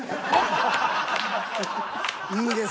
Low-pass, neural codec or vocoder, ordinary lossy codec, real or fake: none; none; none; real